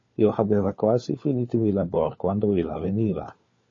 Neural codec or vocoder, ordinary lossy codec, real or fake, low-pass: codec, 16 kHz, 4 kbps, FunCodec, trained on LibriTTS, 50 frames a second; MP3, 32 kbps; fake; 7.2 kHz